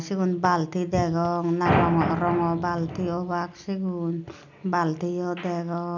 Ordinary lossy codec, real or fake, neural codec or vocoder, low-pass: none; real; none; 7.2 kHz